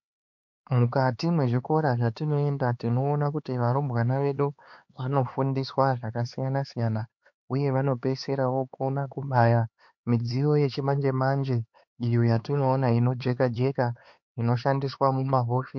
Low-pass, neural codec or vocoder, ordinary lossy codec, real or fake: 7.2 kHz; codec, 16 kHz, 4 kbps, X-Codec, HuBERT features, trained on LibriSpeech; MP3, 48 kbps; fake